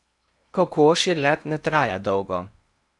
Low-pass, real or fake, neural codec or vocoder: 10.8 kHz; fake; codec, 16 kHz in and 24 kHz out, 0.8 kbps, FocalCodec, streaming, 65536 codes